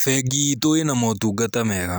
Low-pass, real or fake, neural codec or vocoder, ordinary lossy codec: none; real; none; none